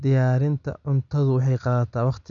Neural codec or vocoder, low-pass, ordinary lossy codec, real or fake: none; 7.2 kHz; none; real